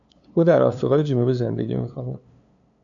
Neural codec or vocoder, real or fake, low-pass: codec, 16 kHz, 2 kbps, FunCodec, trained on LibriTTS, 25 frames a second; fake; 7.2 kHz